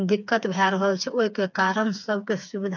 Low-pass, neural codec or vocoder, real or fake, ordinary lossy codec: 7.2 kHz; codec, 16 kHz, 4 kbps, FreqCodec, smaller model; fake; none